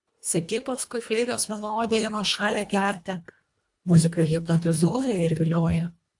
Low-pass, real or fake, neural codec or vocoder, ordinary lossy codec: 10.8 kHz; fake; codec, 24 kHz, 1.5 kbps, HILCodec; AAC, 64 kbps